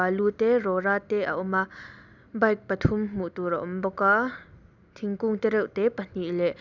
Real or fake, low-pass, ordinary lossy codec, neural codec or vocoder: real; 7.2 kHz; none; none